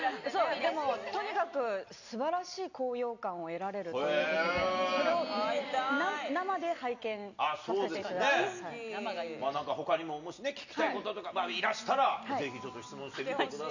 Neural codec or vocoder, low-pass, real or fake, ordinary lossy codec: none; 7.2 kHz; real; none